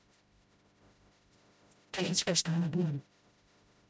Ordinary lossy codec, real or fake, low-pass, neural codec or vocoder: none; fake; none; codec, 16 kHz, 0.5 kbps, FreqCodec, smaller model